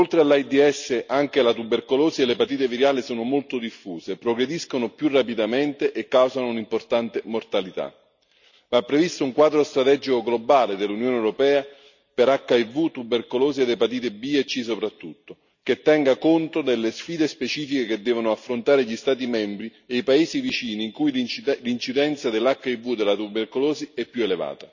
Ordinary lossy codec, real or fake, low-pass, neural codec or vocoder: none; real; 7.2 kHz; none